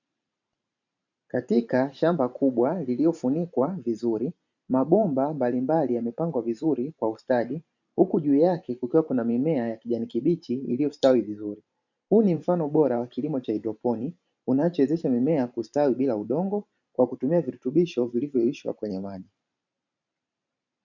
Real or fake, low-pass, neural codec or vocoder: fake; 7.2 kHz; vocoder, 44.1 kHz, 128 mel bands every 512 samples, BigVGAN v2